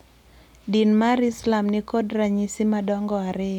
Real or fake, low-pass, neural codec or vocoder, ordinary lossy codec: real; 19.8 kHz; none; none